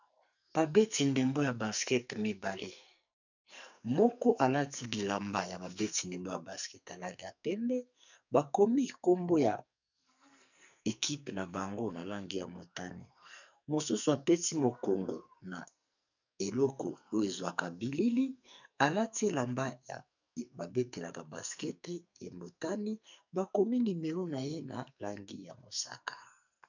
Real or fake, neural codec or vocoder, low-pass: fake; codec, 44.1 kHz, 2.6 kbps, SNAC; 7.2 kHz